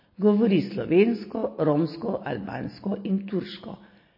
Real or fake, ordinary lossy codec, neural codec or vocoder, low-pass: real; MP3, 24 kbps; none; 5.4 kHz